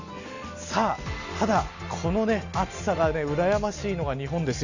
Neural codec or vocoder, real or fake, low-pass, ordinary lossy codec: none; real; 7.2 kHz; Opus, 64 kbps